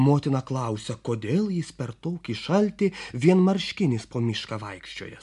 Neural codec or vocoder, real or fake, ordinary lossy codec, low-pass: none; real; MP3, 64 kbps; 10.8 kHz